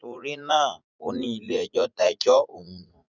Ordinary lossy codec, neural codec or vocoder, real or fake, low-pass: none; vocoder, 44.1 kHz, 80 mel bands, Vocos; fake; 7.2 kHz